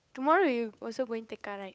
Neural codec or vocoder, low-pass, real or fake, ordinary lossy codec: codec, 16 kHz, 8 kbps, FunCodec, trained on Chinese and English, 25 frames a second; none; fake; none